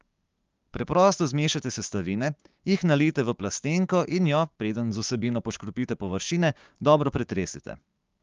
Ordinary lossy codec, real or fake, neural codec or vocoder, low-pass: Opus, 32 kbps; fake; codec, 16 kHz, 6 kbps, DAC; 7.2 kHz